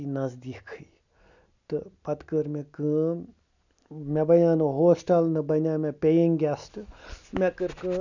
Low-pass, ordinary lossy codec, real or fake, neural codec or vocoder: 7.2 kHz; none; real; none